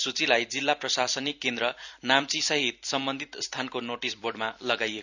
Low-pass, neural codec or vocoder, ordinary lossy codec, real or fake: 7.2 kHz; vocoder, 44.1 kHz, 128 mel bands every 256 samples, BigVGAN v2; none; fake